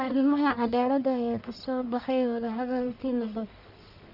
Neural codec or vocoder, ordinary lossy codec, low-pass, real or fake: codec, 44.1 kHz, 1.7 kbps, Pupu-Codec; none; 5.4 kHz; fake